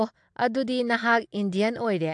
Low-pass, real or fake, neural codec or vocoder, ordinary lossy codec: 9.9 kHz; real; none; AAC, 64 kbps